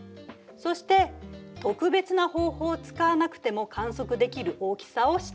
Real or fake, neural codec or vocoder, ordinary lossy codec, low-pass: real; none; none; none